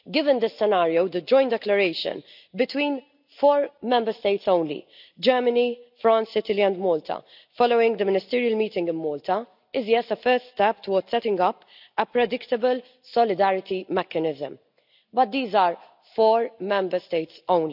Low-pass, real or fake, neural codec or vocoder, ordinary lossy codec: 5.4 kHz; real; none; none